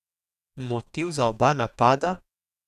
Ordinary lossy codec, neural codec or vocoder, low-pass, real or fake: none; codec, 44.1 kHz, 2.6 kbps, DAC; 14.4 kHz; fake